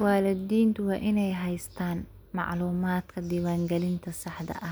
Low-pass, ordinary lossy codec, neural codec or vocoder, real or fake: none; none; none; real